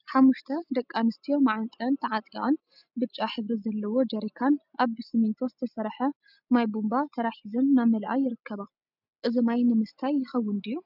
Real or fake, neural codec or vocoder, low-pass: real; none; 5.4 kHz